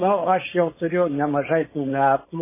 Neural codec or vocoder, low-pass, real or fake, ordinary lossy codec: codec, 16 kHz, 4.8 kbps, FACodec; 3.6 kHz; fake; MP3, 16 kbps